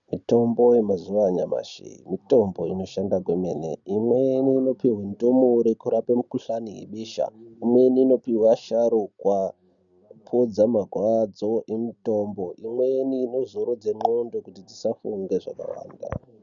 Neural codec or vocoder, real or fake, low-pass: none; real; 7.2 kHz